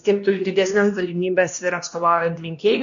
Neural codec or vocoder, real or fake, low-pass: codec, 16 kHz, 1 kbps, X-Codec, WavLM features, trained on Multilingual LibriSpeech; fake; 7.2 kHz